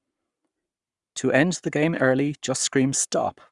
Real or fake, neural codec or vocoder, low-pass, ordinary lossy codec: fake; codec, 44.1 kHz, 7.8 kbps, Pupu-Codec; 10.8 kHz; Opus, 64 kbps